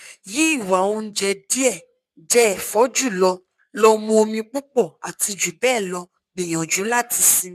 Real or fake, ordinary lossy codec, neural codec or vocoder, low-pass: fake; none; codec, 44.1 kHz, 3.4 kbps, Pupu-Codec; 14.4 kHz